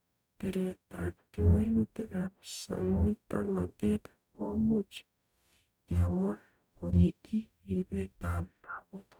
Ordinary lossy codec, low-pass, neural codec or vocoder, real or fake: none; none; codec, 44.1 kHz, 0.9 kbps, DAC; fake